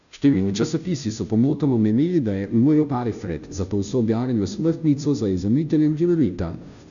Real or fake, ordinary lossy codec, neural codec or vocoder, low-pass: fake; none; codec, 16 kHz, 0.5 kbps, FunCodec, trained on Chinese and English, 25 frames a second; 7.2 kHz